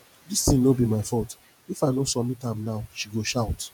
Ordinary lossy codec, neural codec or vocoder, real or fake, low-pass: none; vocoder, 48 kHz, 128 mel bands, Vocos; fake; none